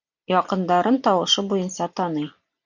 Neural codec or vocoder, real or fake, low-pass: none; real; 7.2 kHz